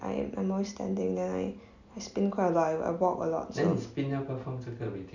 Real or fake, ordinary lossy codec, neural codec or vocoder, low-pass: real; none; none; 7.2 kHz